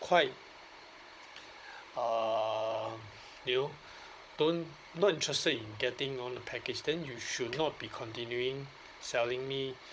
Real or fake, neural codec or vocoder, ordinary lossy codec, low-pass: fake; codec, 16 kHz, 16 kbps, FunCodec, trained on Chinese and English, 50 frames a second; none; none